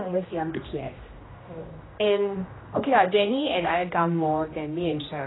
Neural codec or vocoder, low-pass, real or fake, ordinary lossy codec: codec, 16 kHz, 1 kbps, X-Codec, HuBERT features, trained on general audio; 7.2 kHz; fake; AAC, 16 kbps